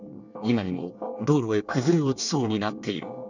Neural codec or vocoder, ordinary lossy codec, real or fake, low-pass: codec, 24 kHz, 1 kbps, SNAC; none; fake; 7.2 kHz